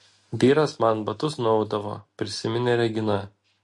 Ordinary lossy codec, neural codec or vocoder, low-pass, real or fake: MP3, 48 kbps; none; 10.8 kHz; real